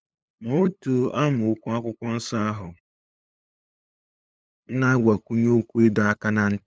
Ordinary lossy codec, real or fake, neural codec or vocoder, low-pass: none; fake; codec, 16 kHz, 8 kbps, FunCodec, trained on LibriTTS, 25 frames a second; none